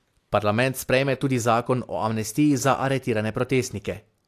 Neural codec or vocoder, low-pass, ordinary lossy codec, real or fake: none; 14.4 kHz; AAC, 64 kbps; real